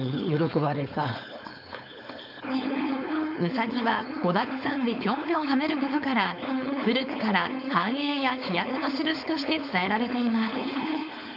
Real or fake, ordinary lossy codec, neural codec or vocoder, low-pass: fake; AAC, 48 kbps; codec, 16 kHz, 4.8 kbps, FACodec; 5.4 kHz